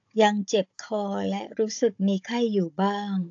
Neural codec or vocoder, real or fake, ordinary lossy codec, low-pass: codec, 16 kHz, 16 kbps, FunCodec, trained on Chinese and English, 50 frames a second; fake; AAC, 48 kbps; 7.2 kHz